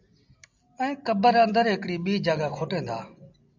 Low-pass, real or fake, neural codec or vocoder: 7.2 kHz; real; none